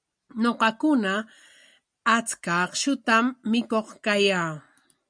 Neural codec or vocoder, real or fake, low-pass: none; real; 9.9 kHz